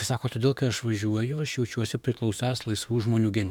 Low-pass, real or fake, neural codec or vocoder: 19.8 kHz; fake; autoencoder, 48 kHz, 32 numbers a frame, DAC-VAE, trained on Japanese speech